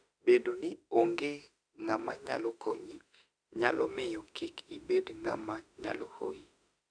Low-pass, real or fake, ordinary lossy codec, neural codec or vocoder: 9.9 kHz; fake; none; autoencoder, 48 kHz, 32 numbers a frame, DAC-VAE, trained on Japanese speech